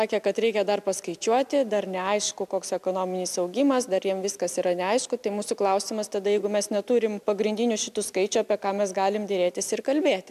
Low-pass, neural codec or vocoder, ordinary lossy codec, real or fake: 14.4 kHz; none; MP3, 96 kbps; real